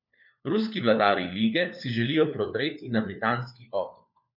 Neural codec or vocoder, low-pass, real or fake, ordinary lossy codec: codec, 16 kHz, 4 kbps, FunCodec, trained on LibriTTS, 50 frames a second; 5.4 kHz; fake; none